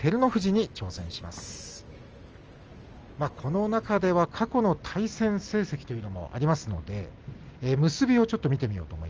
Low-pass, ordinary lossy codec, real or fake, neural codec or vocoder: 7.2 kHz; Opus, 24 kbps; real; none